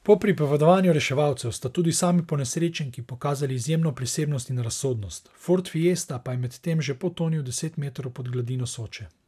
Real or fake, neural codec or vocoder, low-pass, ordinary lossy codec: real; none; 14.4 kHz; none